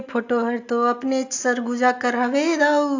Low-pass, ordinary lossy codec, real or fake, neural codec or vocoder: 7.2 kHz; AAC, 48 kbps; real; none